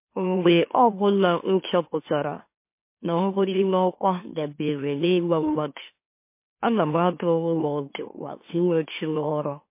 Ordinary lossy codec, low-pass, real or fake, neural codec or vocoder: MP3, 24 kbps; 3.6 kHz; fake; autoencoder, 44.1 kHz, a latent of 192 numbers a frame, MeloTTS